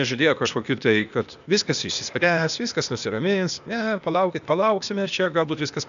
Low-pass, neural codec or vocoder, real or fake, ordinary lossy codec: 7.2 kHz; codec, 16 kHz, 0.8 kbps, ZipCodec; fake; MP3, 96 kbps